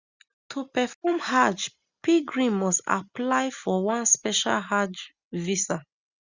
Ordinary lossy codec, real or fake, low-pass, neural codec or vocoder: Opus, 64 kbps; real; 7.2 kHz; none